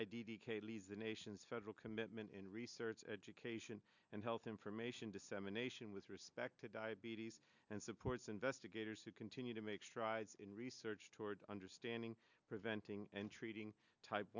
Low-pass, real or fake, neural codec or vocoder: 7.2 kHz; real; none